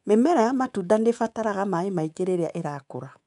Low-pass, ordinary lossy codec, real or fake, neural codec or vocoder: 10.8 kHz; none; fake; codec, 24 kHz, 3.1 kbps, DualCodec